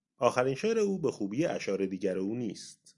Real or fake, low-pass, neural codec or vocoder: real; 10.8 kHz; none